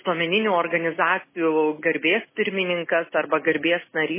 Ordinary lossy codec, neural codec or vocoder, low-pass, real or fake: MP3, 16 kbps; none; 3.6 kHz; real